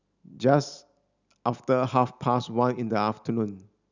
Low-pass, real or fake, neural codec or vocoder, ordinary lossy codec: 7.2 kHz; real; none; none